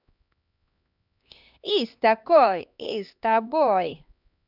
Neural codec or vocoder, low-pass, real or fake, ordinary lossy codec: codec, 16 kHz, 2 kbps, X-Codec, HuBERT features, trained on LibriSpeech; 5.4 kHz; fake; none